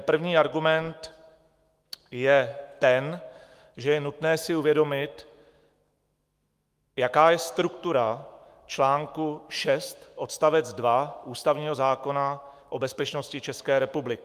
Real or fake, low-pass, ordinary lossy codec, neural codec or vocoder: fake; 14.4 kHz; Opus, 32 kbps; autoencoder, 48 kHz, 128 numbers a frame, DAC-VAE, trained on Japanese speech